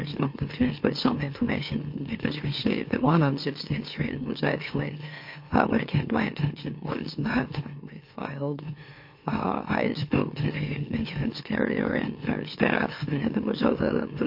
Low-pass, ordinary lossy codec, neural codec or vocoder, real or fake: 5.4 kHz; MP3, 32 kbps; autoencoder, 44.1 kHz, a latent of 192 numbers a frame, MeloTTS; fake